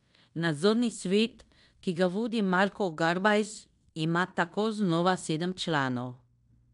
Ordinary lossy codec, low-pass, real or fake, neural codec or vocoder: none; 10.8 kHz; fake; codec, 16 kHz in and 24 kHz out, 0.9 kbps, LongCat-Audio-Codec, fine tuned four codebook decoder